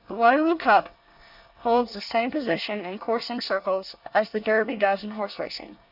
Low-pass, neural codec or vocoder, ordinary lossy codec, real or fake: 5.4 kHz; codec, 24 kHz, 1 kbps, SNAC; Opus, 64 kbps; fake